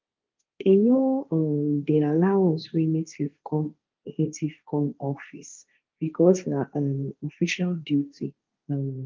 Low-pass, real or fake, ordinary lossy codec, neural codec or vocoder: 7.2 kHz; fake; Opus, 24 kbps; codec, 16 kHz, 1.1 kbps, Voila-Tokenizer